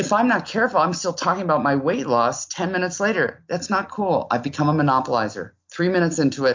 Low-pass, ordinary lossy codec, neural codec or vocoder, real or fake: 7.2 kHz; MP3, 48 kbps; none; real